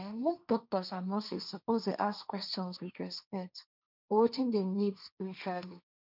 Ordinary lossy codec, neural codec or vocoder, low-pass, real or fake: none; codec, 16 kHz, 1.1 kbps, Voila-Tokenizer; 5.4 kHz; fake